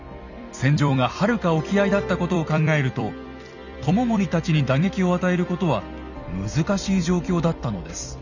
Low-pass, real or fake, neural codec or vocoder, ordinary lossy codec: 7.2 kHz; fake; vocoder, 44.1 kHz, 128 mel bands every 256 samples, BigVGAN v2; none